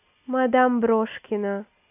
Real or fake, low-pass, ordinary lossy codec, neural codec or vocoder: real; 3.6 kHz; none; none